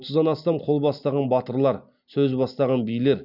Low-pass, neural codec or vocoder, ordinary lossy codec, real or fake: 5.4 kHz; none; none; real